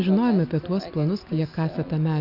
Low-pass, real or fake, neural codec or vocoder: 5.4 kHz; real; none